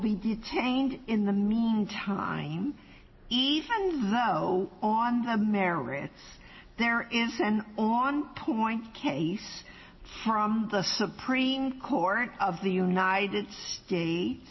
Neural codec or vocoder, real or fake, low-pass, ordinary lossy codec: none; real; 7.2 kHz; MP3, 24 kbps